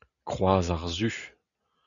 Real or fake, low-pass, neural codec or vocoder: real; 7.2 kHz; none